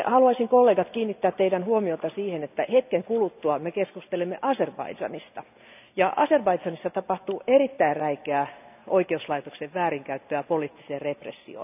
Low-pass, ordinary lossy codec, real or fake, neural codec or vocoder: 3.6 kHz; none; real; none